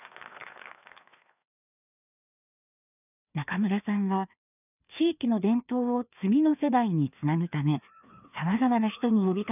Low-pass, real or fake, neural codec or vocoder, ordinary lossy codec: 3.6 kHz; fake; codec, 16 kHz, 2 kbps, FreqCodec, larger model; none